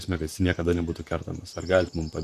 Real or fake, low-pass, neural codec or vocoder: fake; 14.4 kHz; vocoder, 44.1 kHz, 128 mel bands, Pupu-Vocoder